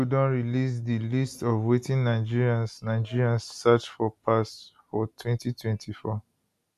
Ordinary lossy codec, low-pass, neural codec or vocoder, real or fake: none; 14.4 kHz; none; real